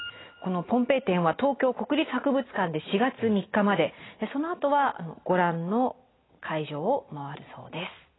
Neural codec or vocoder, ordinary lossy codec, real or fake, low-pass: none; AAC, 16 kbps; real; 7.2 kHz